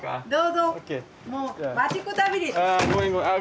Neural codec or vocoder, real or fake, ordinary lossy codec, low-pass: none; real; none; none